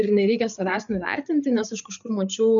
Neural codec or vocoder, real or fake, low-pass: codec, 16 kHz, 8 kbps, FreqCodec, larger model; fake; 7.2 kHz